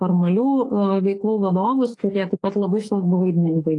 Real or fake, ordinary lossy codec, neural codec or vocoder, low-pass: fake; AAC, 32 kbps; autoencoder, 48 kHz, 32 numbers a frame, DAC-VAE, trained on Japanese speech; 10.8 kHz